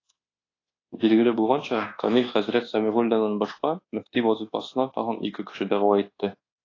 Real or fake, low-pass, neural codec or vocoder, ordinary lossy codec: fake; 7.2 kHz; codec, 24 kHz, 1.2 kbps, DualCodec; AAC, 32 kbps